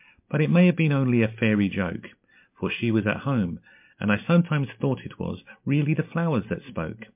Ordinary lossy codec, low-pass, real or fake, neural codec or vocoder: MP3, 32 kbps; 3.6 kHz; real; none